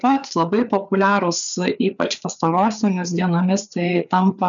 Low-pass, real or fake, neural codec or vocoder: 7.2 kHz; fake; codec, 16 kHz, 4 kbps, FreqCodec, larger model